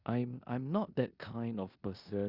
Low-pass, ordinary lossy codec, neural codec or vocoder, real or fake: 5.4 kHz; Opus, 64 kbps; codec, 16 kHz in and 24 kHz out, 0.9 kbps, LongCat-Audio-Codec, four codebook decoder; fake